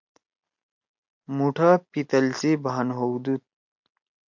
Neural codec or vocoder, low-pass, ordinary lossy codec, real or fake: none; 7.2 kHz; MP3, 48 kbps; real